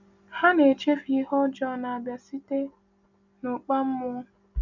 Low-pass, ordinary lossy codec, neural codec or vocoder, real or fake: 7.2 kHz; none; none; real